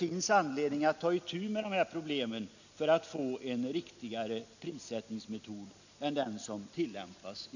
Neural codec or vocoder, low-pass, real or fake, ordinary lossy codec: none; 7.2 kHz; real; none